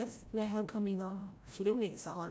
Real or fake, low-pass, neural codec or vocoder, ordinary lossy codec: fake; none; codec, 16 kHz, 0.5 kbps, FreqCodec, larger model; none